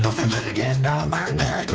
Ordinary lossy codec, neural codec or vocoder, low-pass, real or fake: none; codec, 16 kHz, 2 kbps, X-Codec, WavLM features, trained on Multilingual LibriSpeech; none; fake